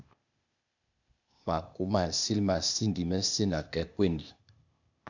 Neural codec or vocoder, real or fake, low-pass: codec, 16 kHz, 0.8 kbps, ZipCodec; fake; 7.2 kHz